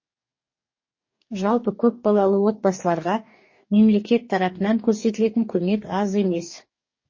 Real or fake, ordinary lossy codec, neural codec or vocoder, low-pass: fake; MP3, 32 kbps; codec, 44.1 kHz, 2.6 kbps, DAC; 7.2 kHz